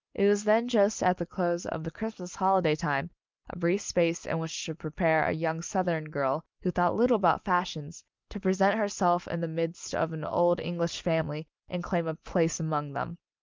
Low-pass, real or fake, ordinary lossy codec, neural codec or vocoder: 7.2 kHz; real; Opus, 24 kbps; none